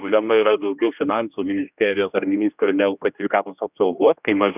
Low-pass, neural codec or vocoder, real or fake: 3.6 kHz; codec, 16 kHz, 1 kbps, X-Codec, HuBERT features, trained on general audio; fake